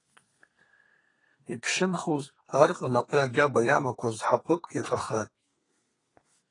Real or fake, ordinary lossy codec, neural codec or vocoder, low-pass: fake; AAC, 32 kbps; codec, 32 kHz, 1.9 kbps, SNAC; 10.8 kHz